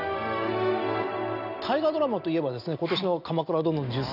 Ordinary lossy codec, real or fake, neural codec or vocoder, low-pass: none; real; none; 5.4 kHz